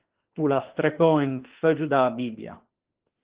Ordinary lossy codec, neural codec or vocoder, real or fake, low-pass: Opus, 16 kbps; autoencoder, 48 kHz, 32 numbers a frame, DAC-VAE, trained on Japanese speech; fake; 3.6 kHz